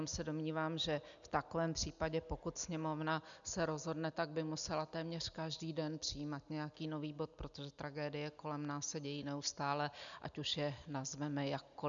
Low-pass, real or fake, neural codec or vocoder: 7.2 kHz; real; none